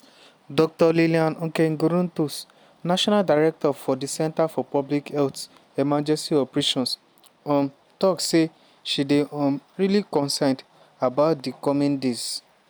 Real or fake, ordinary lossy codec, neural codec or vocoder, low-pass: real; none; none; none